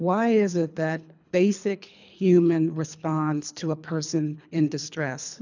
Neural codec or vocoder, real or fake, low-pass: codec, 24 kHz, 3 kbps, HILCodec; fake; 7.2 kHz